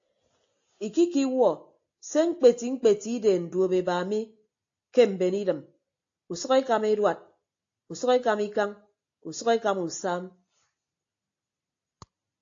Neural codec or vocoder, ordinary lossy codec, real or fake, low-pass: none; AAC, 48 kbps; real; 7.2 kHz